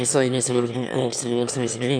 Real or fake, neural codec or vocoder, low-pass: fake; autoencoder, 22.05 kHz, a latent of 192 numbers a frame, VITS, trained on one speaker; 9.9 kHz